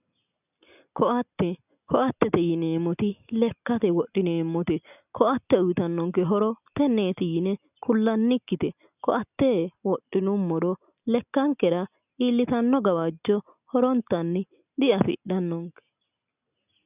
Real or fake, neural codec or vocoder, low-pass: real; none; 3.6 kHz